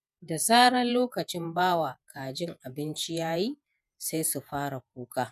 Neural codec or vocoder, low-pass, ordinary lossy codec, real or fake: vocoder, 48 kHz, 128 mel bands, Vocos; 14.4 kHz; none; fake